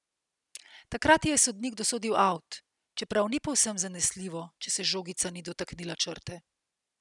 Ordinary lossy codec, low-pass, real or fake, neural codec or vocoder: none; 10.8 kHz; real; none